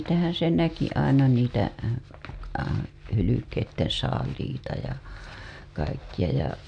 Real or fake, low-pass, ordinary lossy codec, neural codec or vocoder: real; 9.9 kHz; none; none